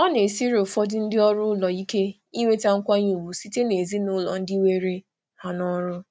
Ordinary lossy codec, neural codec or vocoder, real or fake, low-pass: none; none; real; none